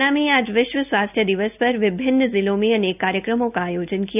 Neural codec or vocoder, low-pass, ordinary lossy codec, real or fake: none; 3.6 kHz; none; real